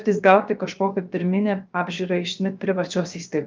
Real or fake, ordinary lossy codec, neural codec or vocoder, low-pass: fake; Opus, 24 kbps; codec, 16 kHz, 0.7 kbps, FocalCodec; 7.2 kHz